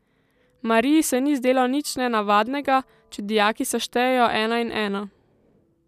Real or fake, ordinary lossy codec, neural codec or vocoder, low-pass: real; none; none; 14.4 kHz